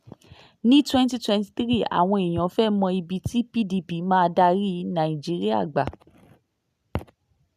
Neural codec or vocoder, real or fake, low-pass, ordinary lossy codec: none; real; 14.4 kHz; none